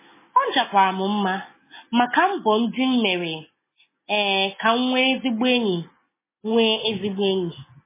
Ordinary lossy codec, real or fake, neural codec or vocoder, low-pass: MP3, 16 kbps; real; none; 3.6 kHz